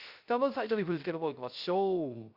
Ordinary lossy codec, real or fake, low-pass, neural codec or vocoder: Opus, 64 kbps; fake; 5.4 kHz; codec, 16 kHz, 0.3 kbps, FocalCodec